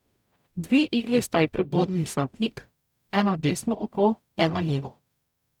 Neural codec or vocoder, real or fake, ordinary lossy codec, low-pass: codec, 44.1 kHz, 0.9 kbps, DAC; fake; none; 19.8 kHz